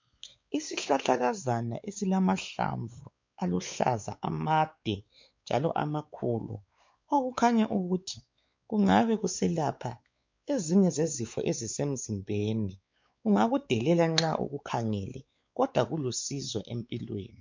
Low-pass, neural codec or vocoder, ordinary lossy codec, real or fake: 7.2 kHz; codec, 16 kHz, 4 kbps, X-Codec, WavLM features, trained on Multilingual LibriSpeech; MP3, 64 kbps; fake